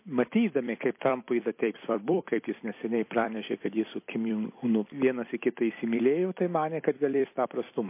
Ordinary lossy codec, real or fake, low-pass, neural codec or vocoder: MP3, 24 kbps; fake; 3.6 kHz; vocoder, 44.1 kHz, 128 mel bands every 256 samples, BigVGAN v2